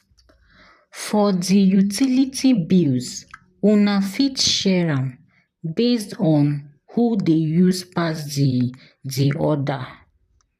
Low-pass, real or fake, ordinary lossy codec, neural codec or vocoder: 14.4 kHz; fake; none; vocoder, 44.1 kHz, 128 mel bands, Pupu-Vocoder